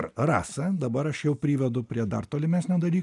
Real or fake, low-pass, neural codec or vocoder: real; 10.8 kHz; none